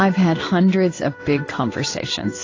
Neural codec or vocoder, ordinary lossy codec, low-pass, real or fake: codec, 16 kHz in and 24 kHz out, 1 kbps, XY-Tokenizer; AAC, 32 kbps; 7.2 kHz; fake